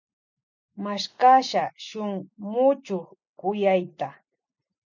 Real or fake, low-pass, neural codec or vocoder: real; 7.2 kHz; none